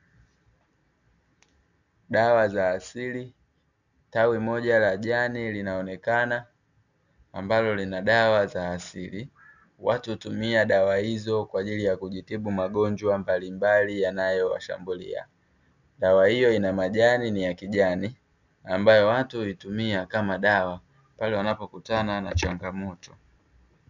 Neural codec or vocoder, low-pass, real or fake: none; 7.2 kHz; real